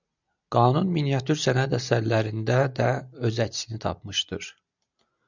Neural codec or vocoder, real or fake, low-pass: none; real; 7.2 kHz